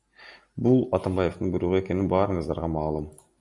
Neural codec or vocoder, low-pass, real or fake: none; 10.8 kHz; real